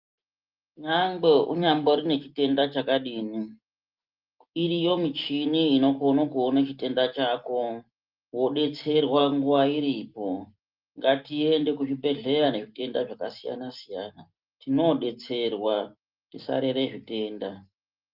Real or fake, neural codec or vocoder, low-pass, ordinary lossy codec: real; none; 5.4 kHz; Opus, 32 kbps